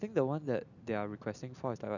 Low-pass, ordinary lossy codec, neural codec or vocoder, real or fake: 7.2 kHz; none; none; real